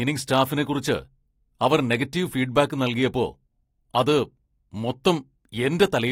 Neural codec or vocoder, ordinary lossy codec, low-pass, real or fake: none; AAC, 48 kbps; 19.8 kHz; real